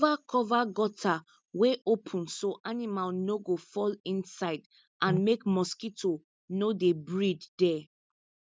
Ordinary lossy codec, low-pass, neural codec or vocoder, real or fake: none; none; none; real